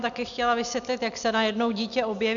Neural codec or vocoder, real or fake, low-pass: none; real; 7.2 kHz